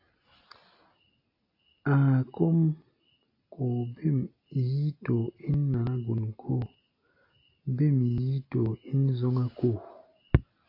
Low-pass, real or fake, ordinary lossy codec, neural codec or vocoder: 5.4 kHz; real; AAC, 32 kbps; none